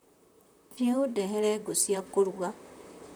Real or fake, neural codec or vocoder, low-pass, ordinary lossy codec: fake; vocoder, 44.1 kHz, 128 mel bands, Pupu-Vocoder; none; none